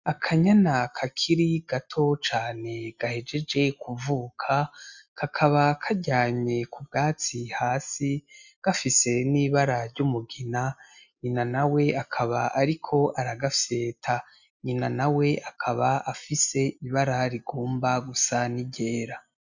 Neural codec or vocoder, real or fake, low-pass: none; real; 7.2 kHz